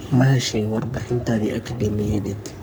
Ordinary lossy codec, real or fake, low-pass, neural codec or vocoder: none; fake; none; codec, 44.1 kHz, 3.4 kbps, Pupu-Codec